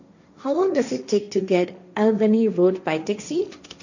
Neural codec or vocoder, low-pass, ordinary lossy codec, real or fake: codec, 16 kHz, 1.1 kbps, Voila-Tokenizer; 7.2 kHz; none; fake